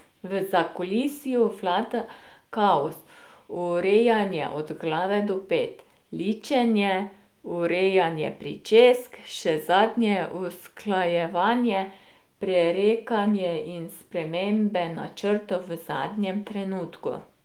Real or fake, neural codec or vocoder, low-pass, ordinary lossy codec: fake; autoencoder, 48 kHz, 128 numbers a frame, DAC-VAE, trained on Japanese speech; 19.8 kHz; Opus, 24 kbps